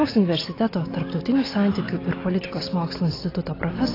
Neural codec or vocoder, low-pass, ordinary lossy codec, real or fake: none; 5.4 kHz; AAC, 24 kbps; real